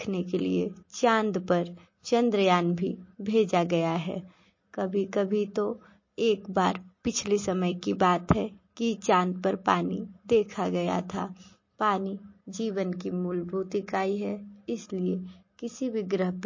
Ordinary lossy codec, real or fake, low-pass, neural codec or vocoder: MP3, 32 kbps; real; 7.2 kHz; none